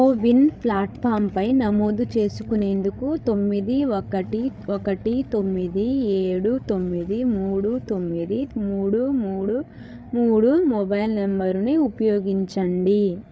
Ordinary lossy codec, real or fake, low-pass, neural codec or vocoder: none; fake; none; codec, 16 kHz, 8 kbps, FreqCodec, larger model